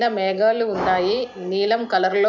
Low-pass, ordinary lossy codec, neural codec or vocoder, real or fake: 7.2 kHz; none; none; real